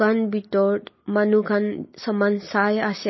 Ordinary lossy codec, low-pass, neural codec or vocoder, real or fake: MP3, 24 kbps; 7.2 kHz; none; real